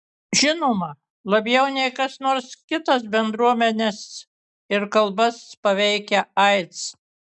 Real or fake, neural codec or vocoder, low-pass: real; none; 10.8 kHz